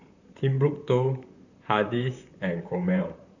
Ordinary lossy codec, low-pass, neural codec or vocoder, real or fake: none; 7.2 kHz; vocoder, 44.1 kHz, 128 mel bands, Pupu-Vocoder; fake